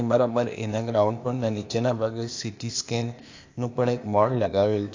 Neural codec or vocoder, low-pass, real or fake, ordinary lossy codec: codec, 16 kHz, 0.8 kbps, ZipCodec; 7.2 kHz; fake; AAC, 48 kbps